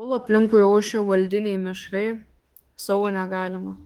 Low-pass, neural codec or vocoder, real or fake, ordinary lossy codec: 19.8 kHz; autoencoder, 48 kHz, 32 numbers a frame, DAC-VAE, trained on Japanese speech; fake; Opus, 24 kbps